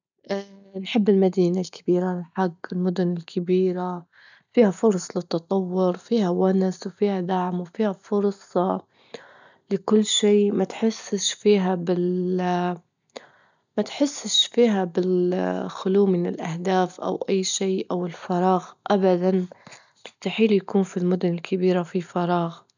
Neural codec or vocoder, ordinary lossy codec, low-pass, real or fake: none; none; 7.2 kHz; real